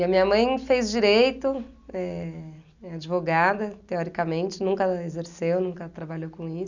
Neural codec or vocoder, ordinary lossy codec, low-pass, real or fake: none; none; 7.2 kHz; real